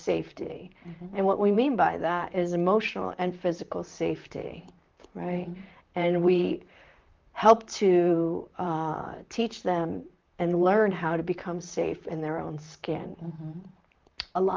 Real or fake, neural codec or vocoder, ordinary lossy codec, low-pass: fake; vocoder, 44.1 kHz, 128 mel bands, Pupu-Vocoder; Opus, 24 kbps; 7.2 kHz